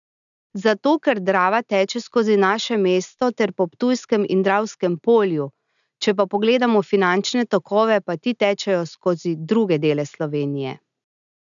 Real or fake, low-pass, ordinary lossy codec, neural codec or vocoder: real; 7.2 kHz; none; none